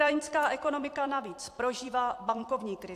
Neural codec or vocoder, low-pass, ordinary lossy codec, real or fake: vocoder, 44.1 kHz, 128 mel bands every 256 samples, BigVGAN v2; 14.4 kHz; AAC, 64 kbps; fake